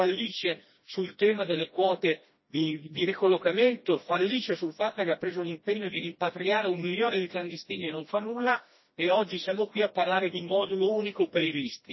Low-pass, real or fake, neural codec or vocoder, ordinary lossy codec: 7.2 kHz; fake; codec, 16 kHz, 1 kbps, FreqCodec, smaller model; MP3, 24 kbps